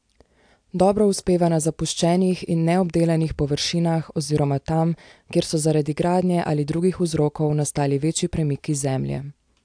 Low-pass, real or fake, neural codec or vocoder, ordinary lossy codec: 9.9 kHz; real; none; AAC, 64 kbps